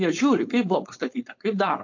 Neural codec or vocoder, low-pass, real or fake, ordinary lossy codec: codec, 16 kHz, 4.8 kbps, FACodec; 7.2 kHz; fake; AAC, 48 kbps